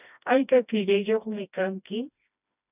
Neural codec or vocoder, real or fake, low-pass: codec, 16 kHz, 1 kbps, FreqCodec, smaller model; fake; 3.6 kHz